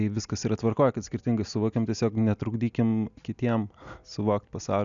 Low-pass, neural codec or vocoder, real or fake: 7.2 kHz; none; real